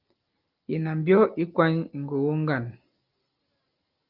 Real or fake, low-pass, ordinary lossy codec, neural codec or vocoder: real; 5.4 kHz; Opus, 32 kbps; none